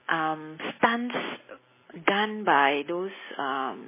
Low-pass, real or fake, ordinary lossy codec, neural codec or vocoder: 3.6 kHz; real; MP3, 16 kbps; none